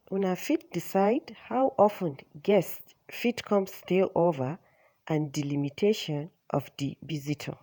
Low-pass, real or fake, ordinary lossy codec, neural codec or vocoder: none; fake; none; vocoder, 48 kHz, 128 mel bands, Vocos